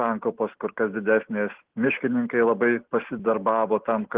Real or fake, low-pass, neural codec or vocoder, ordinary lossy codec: real; 3.6 kHz; none; Opus, 16 kbps